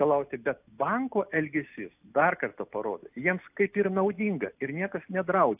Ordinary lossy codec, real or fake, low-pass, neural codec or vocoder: AAC, 32 kbps; real; 3.6 kHz; none